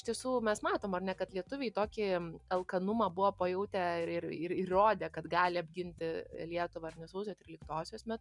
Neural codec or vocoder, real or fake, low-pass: none; real; 10.8 kHz